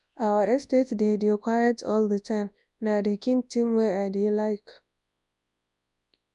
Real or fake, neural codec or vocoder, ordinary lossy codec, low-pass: fake; codec, 24 kHz, 0.9 kbps, WavTokenizer, large speech release; MP3, 96 kbps; 10.8 kHz